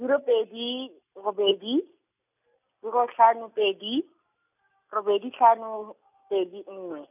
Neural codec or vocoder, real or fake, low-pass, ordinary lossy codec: none; real; 3.6 kHz; none